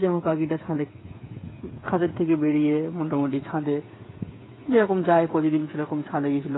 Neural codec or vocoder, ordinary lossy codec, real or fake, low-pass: codec, 16 kHz, 8 kbps, FreqCodec, smaller model; AAC, 16 kbps; fake; 7.2 kHz